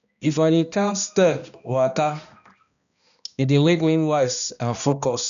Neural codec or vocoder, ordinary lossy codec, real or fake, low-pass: codec, 16 kHz, 1 kbps, X-Codec, HuBERT features, trained on balanced general audio; none; fake; 7.2 kHz